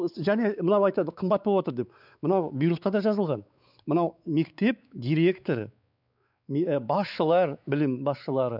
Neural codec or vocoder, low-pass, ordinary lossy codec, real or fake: codec, 16 kHz, 4 kbps, X-Codec, WavLM features, trained on Multilingual LibriSpeech; 5.4 kHz; none; fake